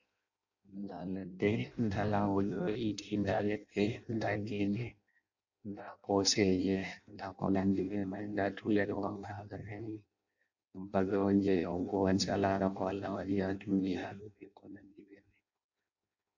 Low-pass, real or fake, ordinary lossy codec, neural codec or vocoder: 7.2 kHz; fake; AAC, 48 kbps; codec, 16 kHz in and 24 kHz out, 0.6 kbps, FireRedTTS-2 codec